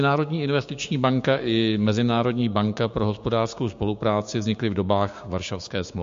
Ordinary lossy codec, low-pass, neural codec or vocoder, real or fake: MP3, 48 kbps; 7.2 kHz; codec, 16 kHz, 6 kbps, DAC; fake